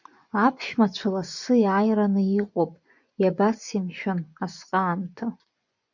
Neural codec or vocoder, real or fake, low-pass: vocoder, 24 kHz, 100 mel bands, Vocos; fake; 7.2 kHz